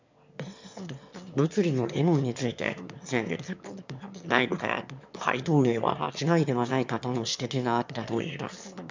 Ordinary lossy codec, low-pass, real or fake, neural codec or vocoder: MP3, 64 kbps; 7.2 kHz; fake; autoencoder, 22.05 kHz, a latent of 192 numbers a frame, VITS, trained on one speaker